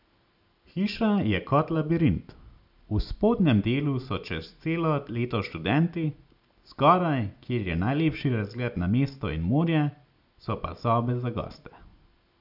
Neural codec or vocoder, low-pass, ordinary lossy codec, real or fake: none; 5.4 kHz; none; real